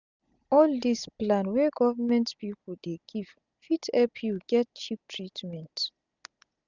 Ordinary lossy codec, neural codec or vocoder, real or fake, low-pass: none; none; real; 7.2 kHz